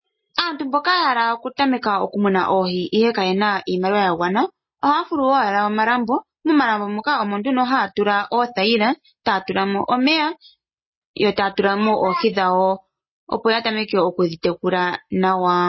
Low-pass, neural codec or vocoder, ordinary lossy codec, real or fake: 7.2 kHz; none; MP3, 24 kbps; real